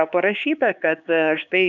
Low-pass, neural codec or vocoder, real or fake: 7.2 kHz; codec, 16 kHz, 4 kbps, X-Codec, HuBERT features, trained on LibriSpeech; fake